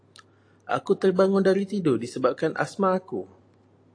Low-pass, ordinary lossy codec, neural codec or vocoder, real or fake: 9.9 kHz; AAC, 48 kbps; none; real